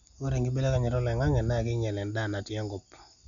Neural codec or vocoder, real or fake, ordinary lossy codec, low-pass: none; real; none; 7.2 kHz